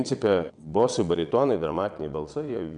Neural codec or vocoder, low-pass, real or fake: vocoder, 22.05 kHz, 80 mel bands, WaveNeXt; 9.9 kHz; fake